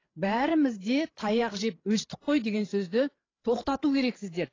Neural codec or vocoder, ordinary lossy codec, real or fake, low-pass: codec, 16 kHz, 8 kbps, FreqCodec, larger model; AAC, 32 kbps; fake; 7.2 kHz